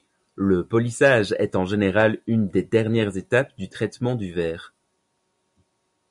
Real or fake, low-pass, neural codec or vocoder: real; 10.8 kHz; none